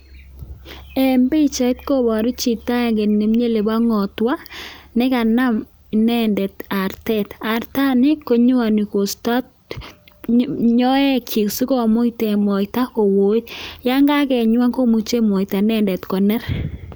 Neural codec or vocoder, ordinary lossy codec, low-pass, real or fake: none; none; none; real